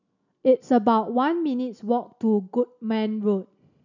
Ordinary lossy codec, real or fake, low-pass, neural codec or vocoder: none; real; 7.2 kHz; none